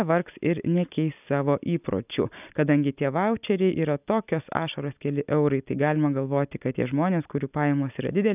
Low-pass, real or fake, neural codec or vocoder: 3.6 kHz; real; none